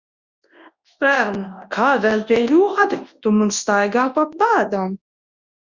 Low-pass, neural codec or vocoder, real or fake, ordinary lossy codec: 7.2 kHz; codec, 24 kHz, 0.9 kbps, WavTokenizer, large speech release; fake; Opus, 64 kbps